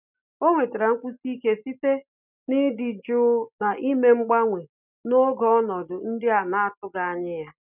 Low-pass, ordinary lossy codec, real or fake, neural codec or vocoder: 3.6 kHz; none; real; none